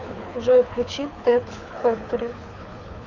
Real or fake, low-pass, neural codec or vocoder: fake; 7.2 kHz; codec, 24 kHz, 6 kbps, HILCodec